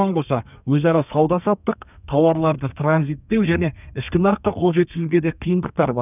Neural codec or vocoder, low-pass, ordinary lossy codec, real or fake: codec, 32 kHz, 1.9 kbps, SNAC; 3.6 kHz; none; fake